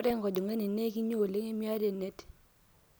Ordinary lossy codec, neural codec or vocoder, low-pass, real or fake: none; none; none; real